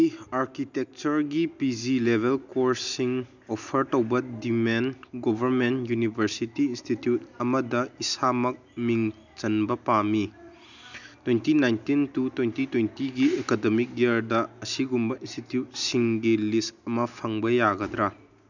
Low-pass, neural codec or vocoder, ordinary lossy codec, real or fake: 7.2 kHz; none; none; real